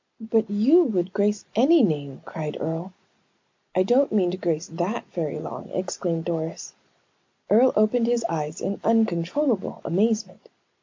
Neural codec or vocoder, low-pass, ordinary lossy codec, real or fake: none; 7.2 kHz; MP3, 48 kbps; real